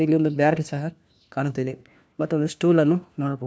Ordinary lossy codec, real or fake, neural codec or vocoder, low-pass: none; fake; codec, 16 kHz, 1 kbps, FunCodec, trained on LibriTTS, 50 frames a second; none